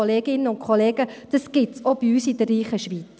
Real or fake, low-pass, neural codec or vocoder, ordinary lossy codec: real; none; none; none